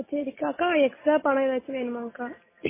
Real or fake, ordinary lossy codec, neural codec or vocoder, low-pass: real; MP3, 16 kbps; none; 3.6 kHz